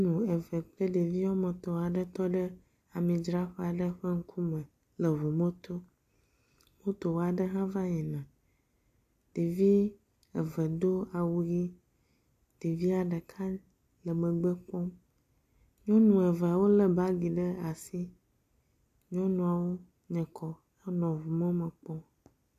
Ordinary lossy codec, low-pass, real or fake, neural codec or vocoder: AAC, 96 kbps; 14.4 kHz; real; none